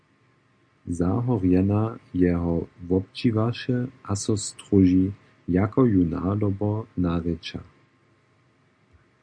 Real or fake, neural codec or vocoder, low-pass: real; none; 9.9 kHz